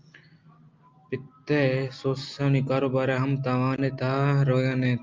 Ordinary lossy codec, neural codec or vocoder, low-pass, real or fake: Opus, 24 kbps; none; 7.2 kHz; real